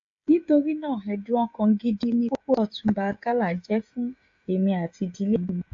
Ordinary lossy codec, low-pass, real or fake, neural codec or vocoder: AAC, 48 kbps; 7.2 kHz; fake; codec, 16 kHz, 16 kbps, FreqCodec, smaller model